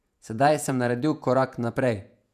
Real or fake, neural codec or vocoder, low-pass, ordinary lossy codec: fake; vocoder, 48 kHz, 128 mel bands, Vocos; 14.4 kHz; none